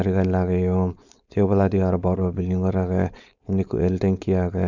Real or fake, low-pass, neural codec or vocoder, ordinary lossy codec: fake; 7.2 kHz; codec, 16 kHz, 4.8 kbps, FACodec; none